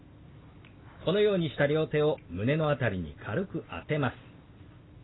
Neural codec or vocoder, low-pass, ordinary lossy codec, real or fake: none; 7.2 kHz; AAC, 16 kbps; real